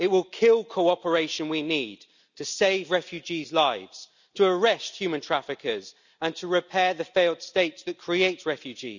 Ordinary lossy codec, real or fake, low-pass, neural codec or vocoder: none; real; 7.2 kHz; none